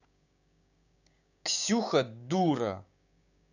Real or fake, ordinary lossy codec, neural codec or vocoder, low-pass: fake; none; codec, 16 kHz, 6 kbps, DAC; 7.2 kHz